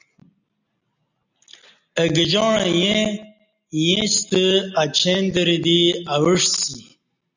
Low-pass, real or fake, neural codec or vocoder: 7.2 kHz; real; none